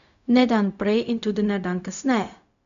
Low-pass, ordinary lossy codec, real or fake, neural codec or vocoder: 7.2 kHz; none; fake; codec, 16 kHz, 0.4 kbps, LongCat-Audio-Codec